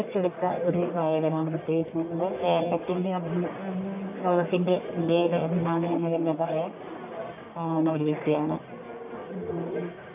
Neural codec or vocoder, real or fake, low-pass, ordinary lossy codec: codec, 44.1 kHz, 1.7 kbps, Pupu-Codec; fake; 3.6 kHz; AAC, 32 kbps